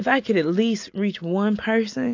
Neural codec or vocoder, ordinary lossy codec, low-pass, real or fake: none; AAC, 48 kbps; 7.2 kHz; real